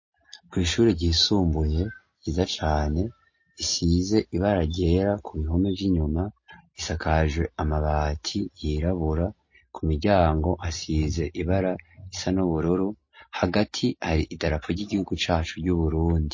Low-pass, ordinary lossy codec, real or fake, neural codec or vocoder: 7.2 kHz; MP3, 32 kbps; real; none